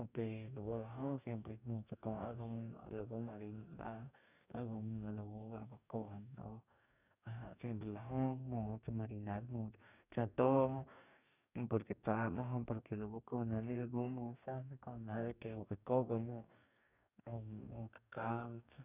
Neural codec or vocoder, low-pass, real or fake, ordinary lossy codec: codec, 44.1 kHz, 2.6 kbps, DAC; 3.6 kHz; fake; none